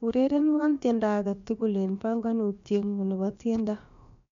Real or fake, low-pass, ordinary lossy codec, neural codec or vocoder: fake; 7.2 kHz; MP3, 64 kbps; codec, 16 kHz, about 1 kbps, DyCAST, with the encoder's durations